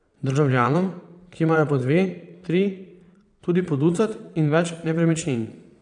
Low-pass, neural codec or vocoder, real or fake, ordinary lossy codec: 9.9 kHz; vocoder, 22.05 kHz, 80 mel bands, Vocos; fake; none